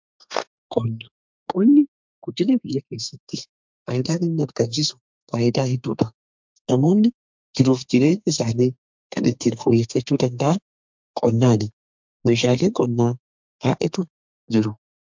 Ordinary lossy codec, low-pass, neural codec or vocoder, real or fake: MP3, 64 kbps; 7.2 kHz; codec, 32 kHz, 1.9 kbps, SNAC; fake